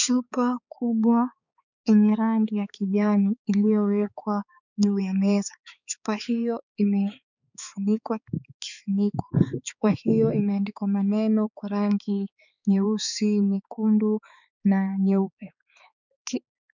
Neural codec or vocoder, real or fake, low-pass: codec, 16 kHz, 4 kbps, X-Codec, HuBERT features, trained on balanced general audio; fake; 7.2 kHz